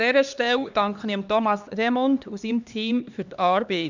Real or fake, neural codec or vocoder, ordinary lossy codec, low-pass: fake; codec, 16 kHz, 2 kbps, X-Codec, HuBERT features, trained on LibriSpeech; none; 7.2 kHz